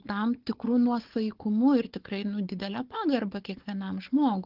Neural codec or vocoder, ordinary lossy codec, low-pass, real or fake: codec, 16 kHz, 4 kbps, FunCodec, trained on Chinese and English, 50 frames a second; Opus, 16 kbps; 5.4 kHz; fake